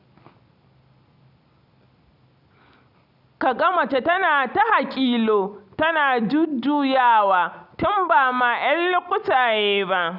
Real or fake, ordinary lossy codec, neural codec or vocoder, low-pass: real; none; none; 5.4 kHz